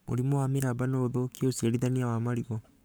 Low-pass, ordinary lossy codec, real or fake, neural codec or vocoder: none; none; fake; codec, 44.1 kHz, 7.8 kbps, Pupu-Codec